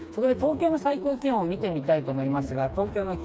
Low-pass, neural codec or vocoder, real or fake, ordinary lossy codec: none; codec, 16 kHz, 2 kbps, FreqCodec, smaller model; fake; none